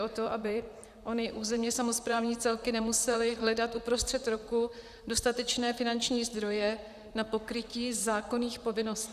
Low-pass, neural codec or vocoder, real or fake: 14.4 kHz; vocoder, 44.1 kHz, 128 mel bands, Pupu-Vocoder; fake